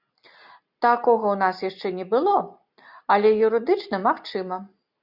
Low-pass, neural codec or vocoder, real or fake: 5.4 kHz; none; real